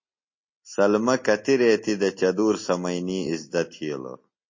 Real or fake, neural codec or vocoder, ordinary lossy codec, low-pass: real; none; MP3, 32 kbps; 7.2 kHz